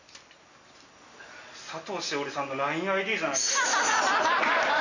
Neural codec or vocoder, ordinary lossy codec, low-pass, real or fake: none; none; 7.2 kHz; real